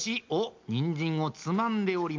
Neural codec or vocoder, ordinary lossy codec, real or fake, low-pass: none; Opus, 24 kbps; real; 7.2 kHz